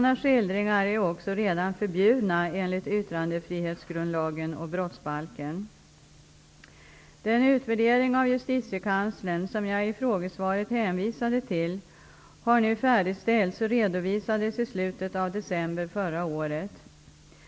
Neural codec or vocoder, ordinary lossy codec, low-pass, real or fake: none; none; none; real